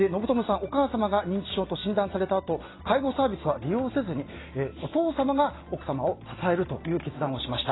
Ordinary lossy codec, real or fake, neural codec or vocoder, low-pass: AAC, 16 kbps; real; none; 7.2 kHz